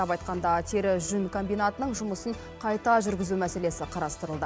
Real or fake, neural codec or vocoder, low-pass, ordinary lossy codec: real; none; none; none